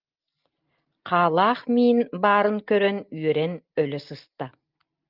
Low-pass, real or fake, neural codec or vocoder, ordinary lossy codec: 5.4 kHz; real; none; Opus, 24 kbps